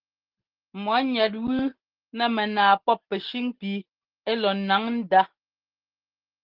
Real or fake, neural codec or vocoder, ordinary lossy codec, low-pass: real; none; Opus, 16 kbps; 5.4 kHz